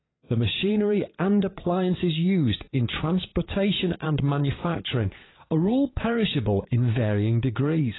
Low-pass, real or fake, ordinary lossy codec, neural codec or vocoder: 7.2 kHz; fake; AAC, 16 kbps; vocoder, 22.05 kHz, 80 mel bands, Vocos